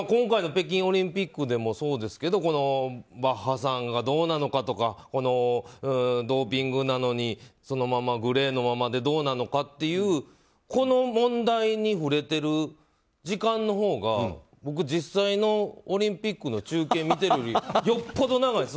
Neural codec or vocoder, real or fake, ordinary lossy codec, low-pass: none; real; none; none